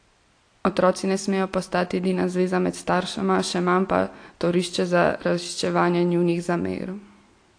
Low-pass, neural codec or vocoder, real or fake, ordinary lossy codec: 9.9 kHz; none; real; AAC, 48 kbps